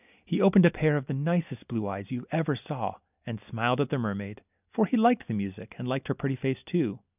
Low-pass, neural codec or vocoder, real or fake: 3.6 kHz; none; real